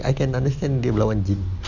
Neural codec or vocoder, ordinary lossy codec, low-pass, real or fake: none; Opus, 64 kbps; 7.2 kHz; real